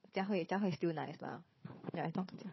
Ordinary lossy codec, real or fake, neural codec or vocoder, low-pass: MP3, 24 kbps; fake; codec, 16 kHz, 4 kbps, FunCodec, trained on Chinese and English, 50 frames a second; 7.2 kHz